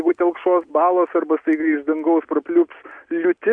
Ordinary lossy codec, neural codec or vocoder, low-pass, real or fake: AAC, 64 kbps; none; 9.9 kHz; real